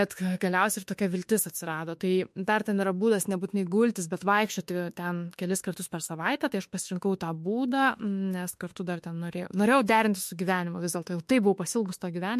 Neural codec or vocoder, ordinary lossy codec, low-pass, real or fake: autoencoder, 48 kHz, 32 numbers a frame, DAC-VAE, trained on Japanese speech; MP3, 64 kbps; 14.4 kHz; fake